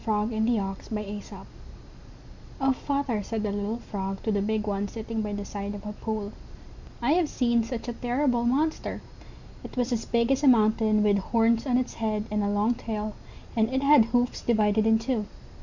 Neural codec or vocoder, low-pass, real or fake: none; 7.2 kHz; real